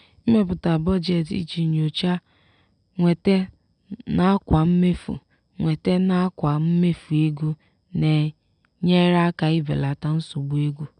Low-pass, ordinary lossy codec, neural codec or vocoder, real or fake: 9.9 kHz; none; none; real